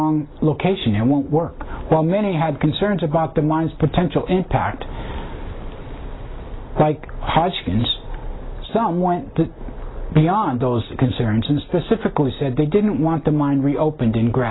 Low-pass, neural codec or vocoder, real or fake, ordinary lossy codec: 7.2 kHz; none; real; AAC, 16 kbps